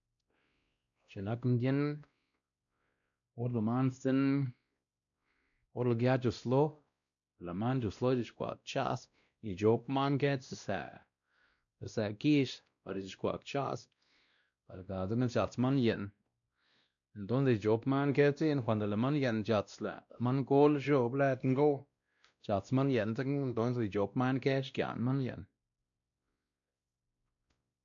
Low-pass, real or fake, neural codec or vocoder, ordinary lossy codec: 7.2 kHz; fake; codec, 16 kHz, 1 kbps, X-Codec, WavLM features, trained on Multilingual LibriSpeech; none